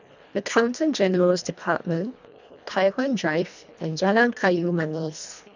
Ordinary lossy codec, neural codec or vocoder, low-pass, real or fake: none; codec, 24 kHz, 1.5 kbps, HILCodec; 7.2 kHz; fake